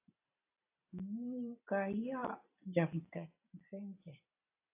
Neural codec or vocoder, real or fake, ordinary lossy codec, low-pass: vocoder, 44.1 kHz, 128 mel bands every 256 samples, BigVGAN v2; fake; AAC, 32 kbps; 3.6 kHz